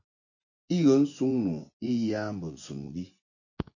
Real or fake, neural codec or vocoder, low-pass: fake; vocoder, 24 kHz, 100 mel bands, Vocos; 7.2 kHz